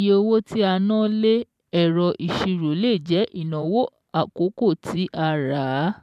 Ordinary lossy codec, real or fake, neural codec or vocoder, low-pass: none; fake; vocoder, 44.1 kHz, 128 mel bands every 512 samples, BigVGAN v2; 14.4 kHz